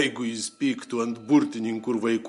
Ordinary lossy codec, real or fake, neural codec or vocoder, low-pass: MP3, 48 kbps; real; none; 14.4 kHz